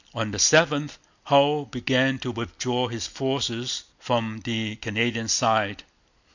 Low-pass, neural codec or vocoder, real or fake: 7.2 kHz; none; real